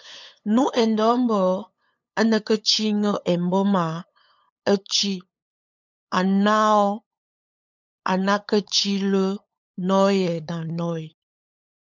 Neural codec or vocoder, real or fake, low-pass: codec, 16 kHz, 8 kbps, FunCodec, trained on LibriTTS, 25 frames a second; fake; 7.2 kHz